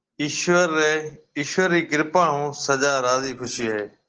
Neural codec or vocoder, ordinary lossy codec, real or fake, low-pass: none; Opus, 16 kbps; real; 7.2 kHz